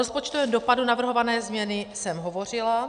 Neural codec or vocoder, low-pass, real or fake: none; 9.9 kHz; real